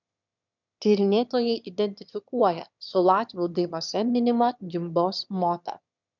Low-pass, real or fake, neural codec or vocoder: 7.2 kHz; fake; autoencoder, 22.05 kHz, a latent of 192 numbers a frame, VITS, trained on one speaker